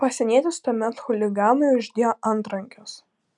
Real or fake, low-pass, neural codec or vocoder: real; 10.8 kHz; none